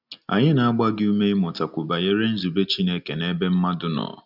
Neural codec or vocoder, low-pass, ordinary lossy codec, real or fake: none; 5.4 kHz; none; real